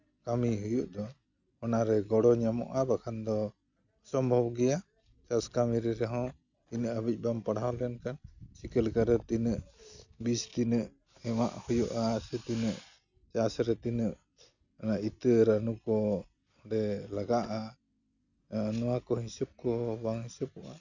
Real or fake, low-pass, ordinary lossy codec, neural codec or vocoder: real; 7.2 kHz; none; none